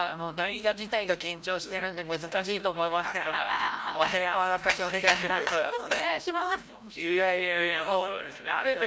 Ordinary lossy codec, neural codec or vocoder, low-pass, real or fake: none; codec, 16 kHz, 0.5 kbps, FreqCodec, larger model; none; fake